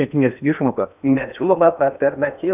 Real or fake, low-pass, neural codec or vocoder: fake; 3.6 kHz; codec, 16 kHz in and 24 kHz out, 0.8 kbps, FocalCodec, streaming, 65536 codes